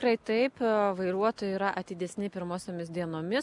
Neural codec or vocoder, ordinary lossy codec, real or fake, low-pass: none; MP3, 96 kbps; real; 10.8 kHz